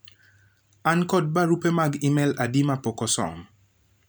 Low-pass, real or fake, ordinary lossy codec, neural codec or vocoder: none; real; none; none